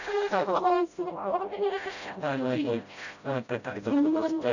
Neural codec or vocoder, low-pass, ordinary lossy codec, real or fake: codec, 16 kHz, 0.5 kbps, FreqCodec, smaller model; 7.2 kHz; none; fake